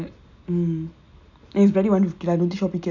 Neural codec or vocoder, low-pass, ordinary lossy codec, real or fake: none; 7.2 kHz; none; real